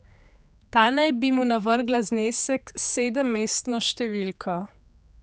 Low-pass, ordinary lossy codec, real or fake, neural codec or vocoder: none; none; fake; codec, 16 kHz, 4 kbps, X-Codec, HuBERT features, trained on general audio